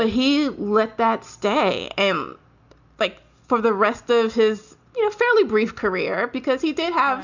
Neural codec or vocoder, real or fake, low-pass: none; real; 7.2 kHz